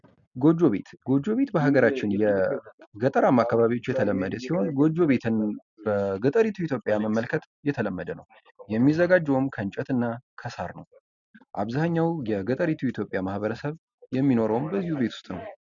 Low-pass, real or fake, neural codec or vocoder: 7.2 kHz; real; none